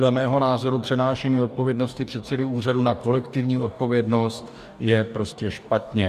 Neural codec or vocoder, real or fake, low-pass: codec, 44.1 kHz, 2.6 kbps, DAC; fake; 14.4 kHz